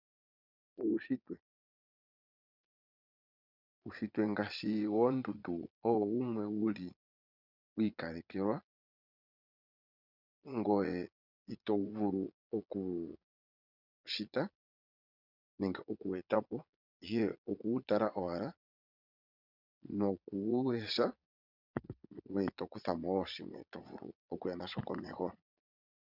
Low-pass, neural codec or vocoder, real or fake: 5.4 kHz; vocoder, 22.05 kHz, 80 mel bands, Vocos; fake